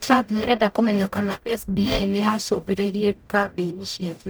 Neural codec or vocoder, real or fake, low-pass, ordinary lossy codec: codec, 44.1 kHz, 0.9 kbps, DAC; fake; none; none